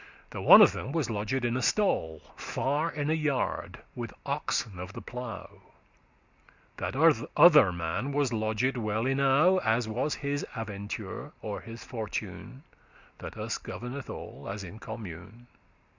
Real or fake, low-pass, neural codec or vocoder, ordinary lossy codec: real; 7.2 kHz; none; Opus, 64 kbps